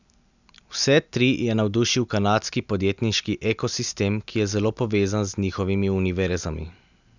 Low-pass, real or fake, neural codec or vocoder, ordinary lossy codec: 7.2 kHz; real; none; none